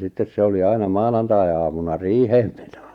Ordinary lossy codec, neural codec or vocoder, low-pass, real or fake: none; none; 19.8 kHz; real